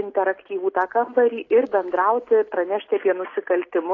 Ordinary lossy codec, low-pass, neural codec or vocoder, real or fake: AAC, 32 kbps; 7.2 kHz; none; real